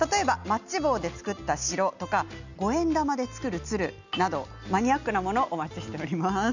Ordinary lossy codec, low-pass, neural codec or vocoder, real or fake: none; 7.2 kHz; none; real